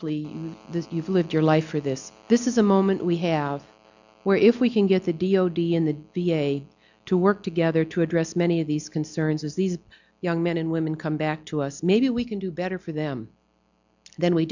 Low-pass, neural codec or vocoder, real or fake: 7.2 kHz; none; real